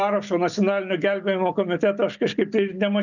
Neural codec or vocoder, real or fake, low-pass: none; real; 7.2 kHz